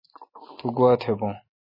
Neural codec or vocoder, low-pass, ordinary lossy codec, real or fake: none; 5.4 kHz; MP3, 24 kbps; real